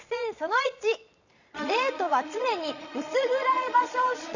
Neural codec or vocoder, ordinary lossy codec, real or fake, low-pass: vocoder, 22.05 kHz, 80 mel bands, Vocos; none; fake; 7.2 kHz